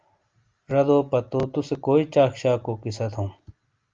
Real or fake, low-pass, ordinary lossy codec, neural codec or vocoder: real; 7.2 kHz; Opus, 32 kbps; none